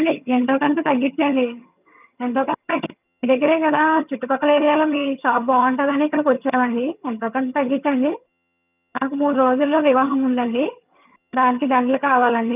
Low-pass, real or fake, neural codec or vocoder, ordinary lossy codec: 3.6 kHz; fake; vocoder, 22.05 kHz, 80 mel bands, HiFi-GAN; none